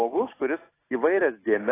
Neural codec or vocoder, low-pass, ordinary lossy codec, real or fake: none; 3.6 kHz; AAC, 16 kbps; real